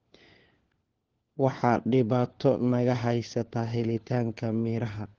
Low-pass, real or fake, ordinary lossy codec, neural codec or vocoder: 7.2 kHz; fake; Opus, 16 kbps; codec, 16 kHz, 4 kbps, FunCodec, trained on LibriTTS, 50 frames a second